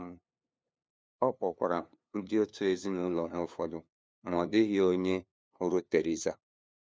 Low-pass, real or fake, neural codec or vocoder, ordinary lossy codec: none; fake; codec, 16 kHz, 2 kbps, FunCodec, trained on LibriTTS, 25 frames a second; none